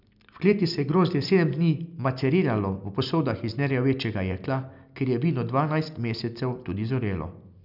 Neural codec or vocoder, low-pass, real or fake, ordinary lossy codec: none; 5.4 kHz; real; none